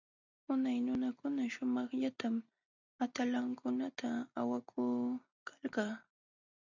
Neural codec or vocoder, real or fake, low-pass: none; real; 7.2 kHz